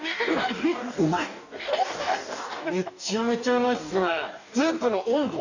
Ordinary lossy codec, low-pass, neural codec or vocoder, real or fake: none; 7.2 kHz; codec, 44.1 kHz, 2.6 kbps, DAC; fake